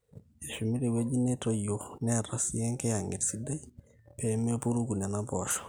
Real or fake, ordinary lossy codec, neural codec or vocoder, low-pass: real; none; none; none